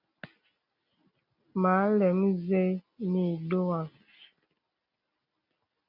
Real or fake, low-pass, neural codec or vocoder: real; 5.4 kHz; none